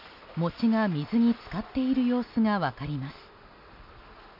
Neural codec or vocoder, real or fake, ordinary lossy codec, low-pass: none; real; none; 5.4 kHz